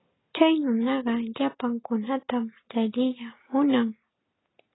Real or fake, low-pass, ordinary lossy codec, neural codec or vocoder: real; 7.2 kHz; AAC, 16 kbps; none